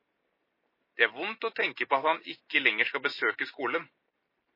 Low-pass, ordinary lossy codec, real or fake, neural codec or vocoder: 5.4 kHz; MP3, 24 kbps; real; none